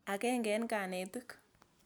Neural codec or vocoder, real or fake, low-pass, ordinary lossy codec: none; real; none; none